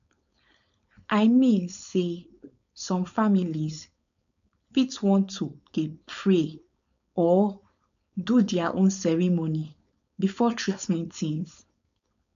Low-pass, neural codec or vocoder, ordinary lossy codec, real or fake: 7.2 kHz; codec, 16 kHz, 4.8 kbps, FACodec; none; fake